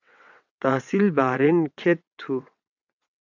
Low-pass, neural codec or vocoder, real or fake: 7.2 kHz; vocoder, 22.05 kHz, 80 mel bands, WaveNeXt; fake